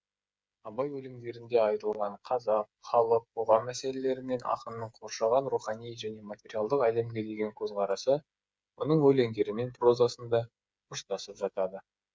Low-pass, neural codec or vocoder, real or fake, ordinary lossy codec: none; codec, 16 kHz, 8 kbps, FreqCodec, smaller model; fake; none